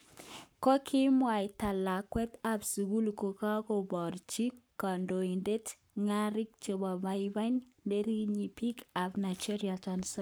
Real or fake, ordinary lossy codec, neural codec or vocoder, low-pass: fake; none; codec, 44.1 kHz, 7.8 kbps, Pupu-Codec; none